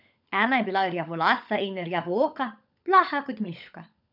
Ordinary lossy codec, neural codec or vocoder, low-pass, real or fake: none; codec, 16 kHz, 16 kbps, FunCodec, trained on LibriTTS, 50 frames a second; 5.4 kHz; fake